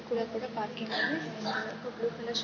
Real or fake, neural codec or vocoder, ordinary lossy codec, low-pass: real; none; MP3, 32 kbps; 7.2 kHz